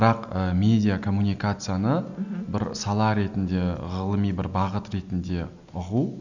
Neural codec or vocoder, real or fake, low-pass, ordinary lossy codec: none; real; 7.2 kHz; none